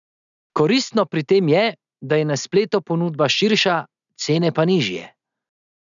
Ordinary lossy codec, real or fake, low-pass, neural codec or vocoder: none; real; 7.2 kHz; none